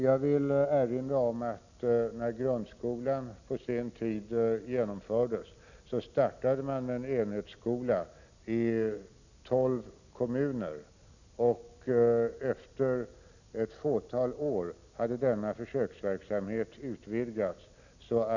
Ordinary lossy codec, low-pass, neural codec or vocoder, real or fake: none; 7.2 kHz; none; real